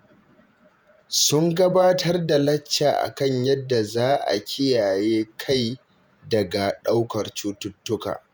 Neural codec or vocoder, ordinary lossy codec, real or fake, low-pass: vocoder, 48 kHz, 128 mel bands, Vocos; none; fake; none